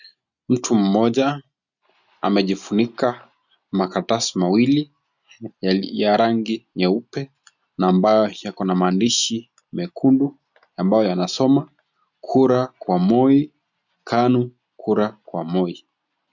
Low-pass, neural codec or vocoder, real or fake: 7.2 kHz; none; real